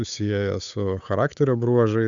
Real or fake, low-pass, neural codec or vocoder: fake; 7.2 kHz; codec, 16 kHz, 8 kbps, FunCodec, trained on Chinese and English, 25 frames a second